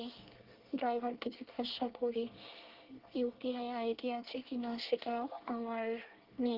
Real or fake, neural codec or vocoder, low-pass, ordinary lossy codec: fake; codec, 24 kHz, 1 kbps, SNAC; 5.4 kHz; Opus, 16 kbps